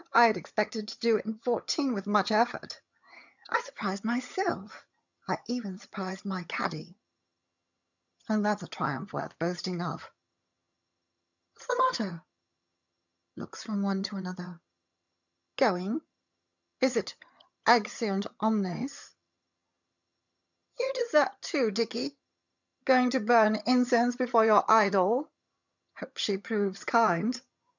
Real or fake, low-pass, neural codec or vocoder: fake; 7.2 kHz; vocoder, 22.05 kHz, 80 mel bands, HiFi-GAN